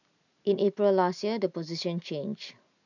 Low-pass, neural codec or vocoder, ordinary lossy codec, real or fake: 7.2 kHz; none; none; real